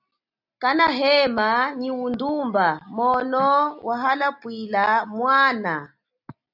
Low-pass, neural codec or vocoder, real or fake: 5.4 kHz; none; real